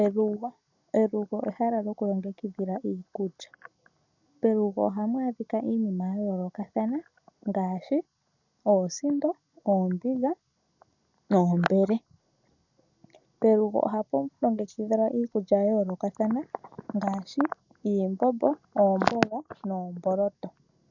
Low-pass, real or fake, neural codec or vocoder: 7.2 kHz; real; none